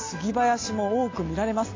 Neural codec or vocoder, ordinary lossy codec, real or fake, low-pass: none; AAC, 32 kbps; real; 7.2 kHz